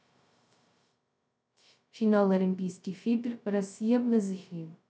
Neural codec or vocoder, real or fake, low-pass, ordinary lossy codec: codec, 16 kHz, 0.2 kbps, FocalCodec; fake; none; none